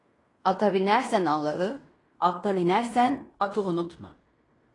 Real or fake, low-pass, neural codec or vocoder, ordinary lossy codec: fake; 10.8 kHz; codec, 16 kHz in and 24 kHz out, 0.9 kbps, LongCat-Audio-Codec, fine tuned four codebook decoder; AAC, 32 kbps